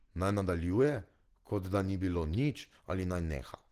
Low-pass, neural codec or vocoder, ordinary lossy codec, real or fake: 14.4 kHz; none; Opus, 16 kbps; real